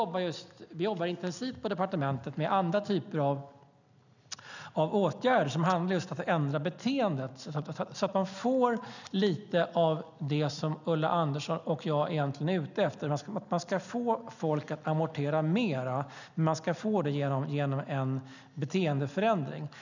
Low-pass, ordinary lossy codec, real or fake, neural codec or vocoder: 7.2 kHz; none; real; none